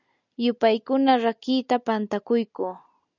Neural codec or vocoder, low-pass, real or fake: none; 7.2 kHz; real